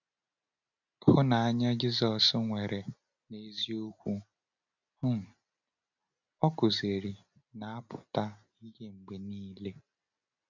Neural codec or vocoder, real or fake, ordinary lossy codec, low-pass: none; real; none; 7.2 kHz